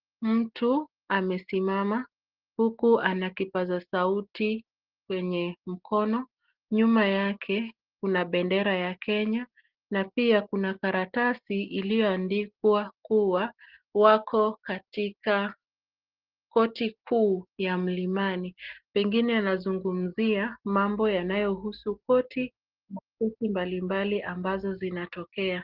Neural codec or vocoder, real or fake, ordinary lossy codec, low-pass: none; real; Opus, 16 kbps; 5.4 kHz